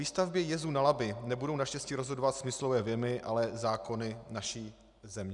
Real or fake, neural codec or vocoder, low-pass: real; none; 10.8 kHz